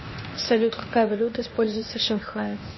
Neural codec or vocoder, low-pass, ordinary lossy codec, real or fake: codec, 16 kHz, 0.8 kbps, ZipCodec; 7.2 kHz; MP3, 24 kbps; fake